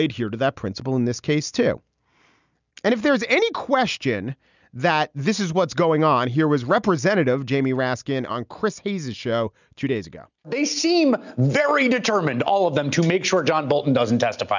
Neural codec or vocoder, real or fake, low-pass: none; real; 7.2 kHz